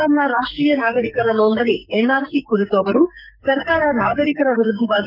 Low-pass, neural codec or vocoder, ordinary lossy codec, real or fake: 5.4 kHz; codec, 44.1 kHz, 2.6 kbps, SNAC; none; fake